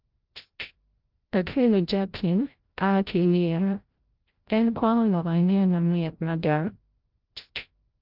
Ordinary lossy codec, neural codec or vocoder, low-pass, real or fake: Opus, 32 kbps; codec, 16 kHz, 0.5 kbps, FreqCodec, larger model; 5.4 kHz; fake